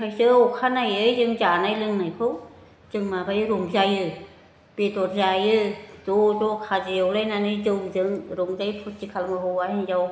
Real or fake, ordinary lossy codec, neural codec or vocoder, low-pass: real; none; none; none